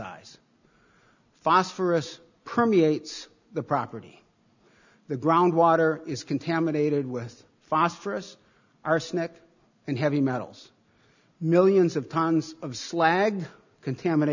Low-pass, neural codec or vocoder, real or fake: 7.2 kHz; none; real